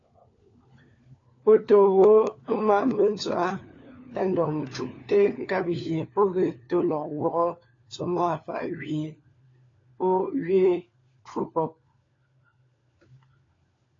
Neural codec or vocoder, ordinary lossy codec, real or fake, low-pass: codec, 16 kHz, 4 kbps, FunCodec, trained on LibriTTS, 50 frames a second; AAC, 32 kbps; fake; 7.2 kHz